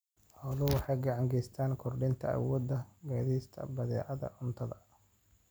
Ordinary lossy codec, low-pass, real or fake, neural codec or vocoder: none; none; real; none